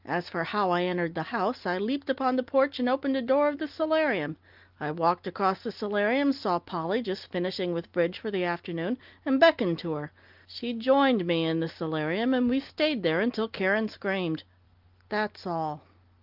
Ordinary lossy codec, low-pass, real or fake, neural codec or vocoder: Opus, 32 kbps; 5.4 kHz; real; none